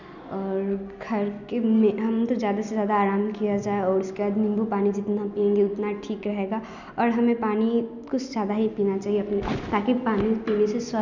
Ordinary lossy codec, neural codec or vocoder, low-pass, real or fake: none; none; 7.2 kHz; real